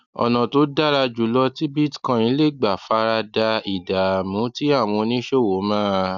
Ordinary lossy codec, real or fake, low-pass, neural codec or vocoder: none; real; 7.2 kHz; none